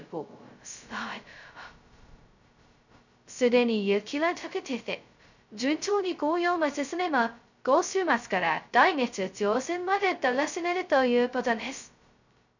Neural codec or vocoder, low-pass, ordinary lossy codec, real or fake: codec, 16 kHz, 0.2 kbps, FocalCodec; 7.2 kHz; none; fake